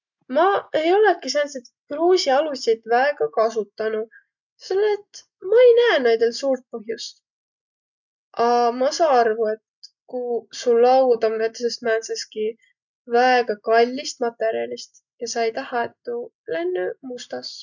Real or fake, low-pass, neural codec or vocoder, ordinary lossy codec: real; 7.2 kHz; none; none